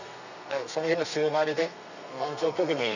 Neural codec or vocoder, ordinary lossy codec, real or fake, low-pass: codec, 32 kHz, 1.9 kbps, SNAC; none; fake; 7.2 kHz